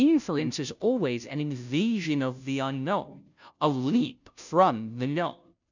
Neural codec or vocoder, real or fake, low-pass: codec, 16 kHz, 0.5 kbps, FunCodec, trained on Chinese and English, 25 frames a second; fake; 7.2 kHz